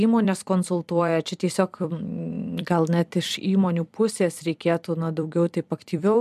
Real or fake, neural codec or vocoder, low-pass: fake; vocoder, 44.1 kHz, 128 mel bands every 256 samples, BigVGAN v2; 14.4 kHz